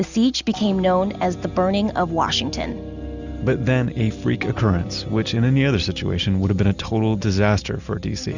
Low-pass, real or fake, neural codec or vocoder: 7.2 kHz; real; none